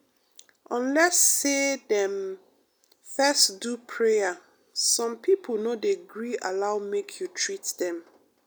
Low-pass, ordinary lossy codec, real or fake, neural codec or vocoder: none; none; real; none